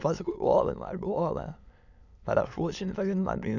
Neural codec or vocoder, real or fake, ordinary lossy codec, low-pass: autoencoder, 22.05 kHz, a latent of 192 numbers a frame, VITS, trained on many speakers; fake; none; 7.2 kHz